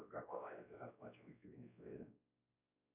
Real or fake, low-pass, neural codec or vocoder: fake; 3.6 kHz; codec, 16 kHz, 1 kbps, X-Codec, WavLM features, trained on Multilingual LibriSpeech